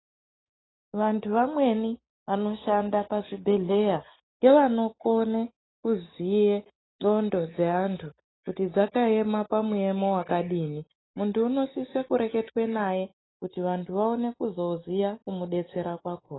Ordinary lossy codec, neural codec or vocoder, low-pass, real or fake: AAC, 16 kbps; none; 7.2 kHz; real